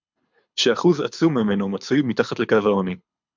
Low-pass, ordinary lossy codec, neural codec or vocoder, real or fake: 7.2 kHz; MP3, 64 kbps; codec, 24 kHz, 6 kbps, HILCodec; fake